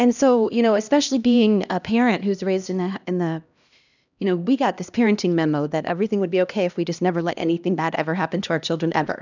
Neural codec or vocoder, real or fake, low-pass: codec, 16 kHz, 1 kbps, X-Codec, HuBERT features, trained on LibriSpeech; fake; 7.2 kHz